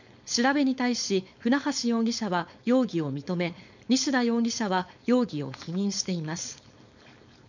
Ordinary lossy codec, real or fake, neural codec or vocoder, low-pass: none; fake; codec, 16 kHz, 4.8 kbps, FACodec; 7.2 kHz